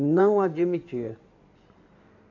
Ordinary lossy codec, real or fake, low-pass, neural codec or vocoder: AAC, 48 kbps; fake; 7.2 kHz; codec, 16 kHz in and 24 kHz out, 2.2 kbps, FireRedTTS-2 codec